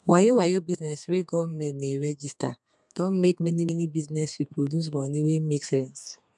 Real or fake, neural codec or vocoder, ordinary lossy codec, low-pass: fake; codec, 32 kHz, 1.9 kbps, SNAC; MP3, 96 kbps; 10.8 kHz